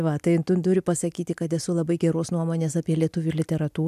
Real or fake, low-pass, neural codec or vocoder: real; 14.4 kHz; none